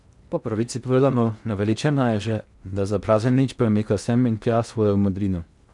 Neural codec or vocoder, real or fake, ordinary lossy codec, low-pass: codec, 16 kHz in and 24 kHz out, 0.6 kbps, FocalCodec, streaming, 2048 codes; fake; none; 10.8 kHz